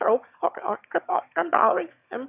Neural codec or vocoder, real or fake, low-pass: autoencoder, 22.05 kHz, a latent of 192 numbers a frame, VITS, trained on one speaker; fake; 3.6 kHz